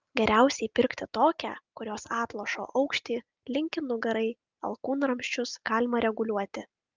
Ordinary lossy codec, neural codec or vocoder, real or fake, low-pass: Opus, 24 kbps; none; real; 7.2 kHz